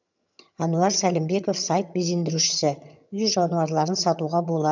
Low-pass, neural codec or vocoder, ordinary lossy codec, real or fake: 7.2 kHz; vocoder, 22.05 kHz, 80 mel bands, HiFi-GAN; none; fake